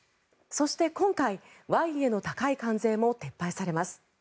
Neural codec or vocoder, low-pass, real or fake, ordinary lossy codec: none; none; real; none